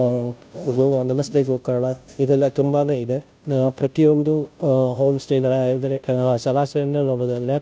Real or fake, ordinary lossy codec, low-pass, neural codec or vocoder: fake; none; none; codec, 16 kHz, 0.5 kbps, FunCodec, trained on Chinese and English, 25 frames a second